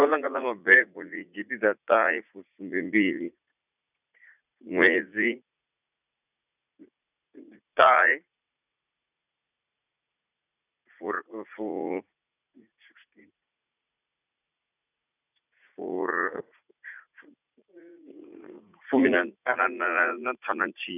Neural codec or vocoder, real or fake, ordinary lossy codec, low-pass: vocoder, 44.1 kHz, 80 mel bands, Vocos; fake; none; 3.6 kHz